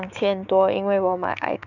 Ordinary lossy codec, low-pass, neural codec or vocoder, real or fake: none; 7.2 kHz; codec, 16 kHz, 6 kbps, DAC; fake